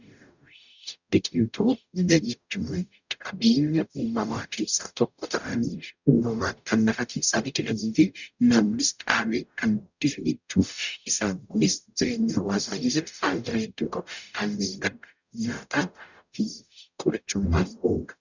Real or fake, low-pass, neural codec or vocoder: fake; 7.2 kHz; codec, 44.1 kHz, 0.9 kbps, DAC